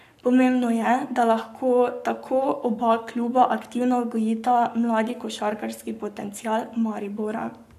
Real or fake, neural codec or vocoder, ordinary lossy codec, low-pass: fake; codec, 44.1 kHz, 7.8 kbps, Pupu-Codec; none; 14.4 kHz